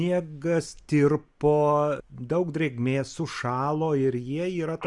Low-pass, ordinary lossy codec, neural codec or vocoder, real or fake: 10.8 kHz; Opus, 64 kbps; none; real